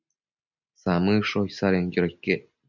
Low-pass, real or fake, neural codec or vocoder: 7.2 kHz; real; none